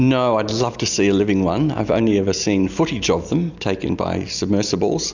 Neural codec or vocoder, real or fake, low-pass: none; real; 7.2 kHz